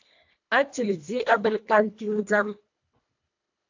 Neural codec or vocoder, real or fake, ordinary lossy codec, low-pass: codec, 24 kHz, 1.5 kbps, HILCodec; fake; AAC, 48 kbps; 7.2 kHz